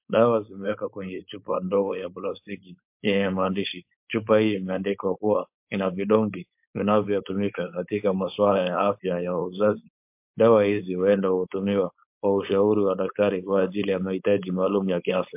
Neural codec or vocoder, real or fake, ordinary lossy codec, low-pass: codec, 16 kHz, 4.8 kbps, FACodec; fake; MP3, 32 kbps; 3.6 kHz